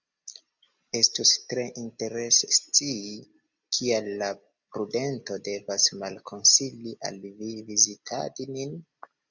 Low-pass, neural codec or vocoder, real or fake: 7.2 kHz; none; real